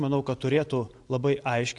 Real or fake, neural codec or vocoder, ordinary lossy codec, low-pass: real; none; AAC, 64 kbps; 10.8 kHz